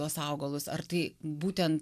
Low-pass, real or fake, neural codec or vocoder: 14.4 kHz; real; none